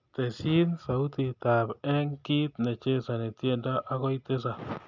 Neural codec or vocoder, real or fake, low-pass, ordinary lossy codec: none; real; 7.2 kHz; none